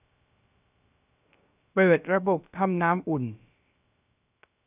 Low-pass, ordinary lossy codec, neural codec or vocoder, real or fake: 3.6 kHz; AAC, 32 kbps; codec, 16 kHz, 0.3 kbps, FocalCodec; fake